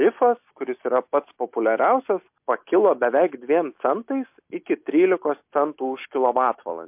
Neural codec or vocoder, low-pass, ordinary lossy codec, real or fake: none; 3.6 kHz; MP3, 32 kbps; real